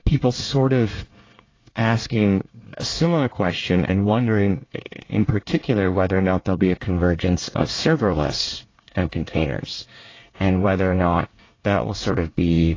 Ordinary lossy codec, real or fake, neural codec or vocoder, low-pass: AAC, 32 kbps; fake; codec, 24 kHz, 1 kbps, SNAC; 7.2 kHz